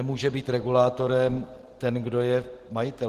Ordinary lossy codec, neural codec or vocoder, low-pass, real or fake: Opus, 16 kbps; none; 14.4 kHz; real